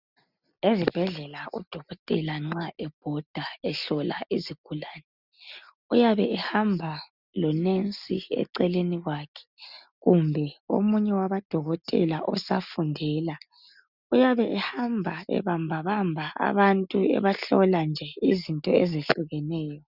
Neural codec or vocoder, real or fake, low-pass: none; real; 5.4 kHz